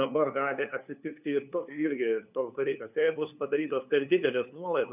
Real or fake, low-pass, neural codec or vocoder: fake; 3.6 kHz; codec, 16 kHz, 2 kbps, FunCodec, trained on LibriTTS, 25 frames a second